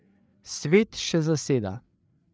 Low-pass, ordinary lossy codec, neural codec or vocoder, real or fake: none; none; codec, 16 kHz, 8 kbps, FreqCodec, larger model; fake